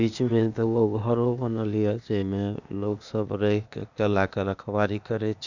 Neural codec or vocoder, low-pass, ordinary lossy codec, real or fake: codec, 16 kHz, 0.8 kbps, ZipCodec; 7.2 kHz; none; fake